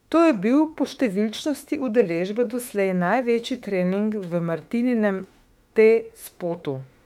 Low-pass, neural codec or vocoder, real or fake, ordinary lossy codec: 19.8 kHz; autoencoder, 48 kHz, 32 numbers a frame, DAC-VAE, trained on Japanese speech; fake; MP3, 96 kbps